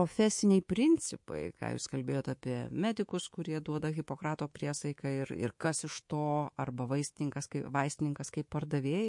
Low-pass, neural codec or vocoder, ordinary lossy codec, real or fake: 10.8 kHz; codec, 24 kHz, 3.1 kbps, DualCodec; MP3, 48 kbps; fake